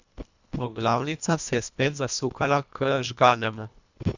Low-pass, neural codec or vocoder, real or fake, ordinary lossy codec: 7.2 kHz; codec, 24 kHz, 1.5 kbps, HILCodec; fake; none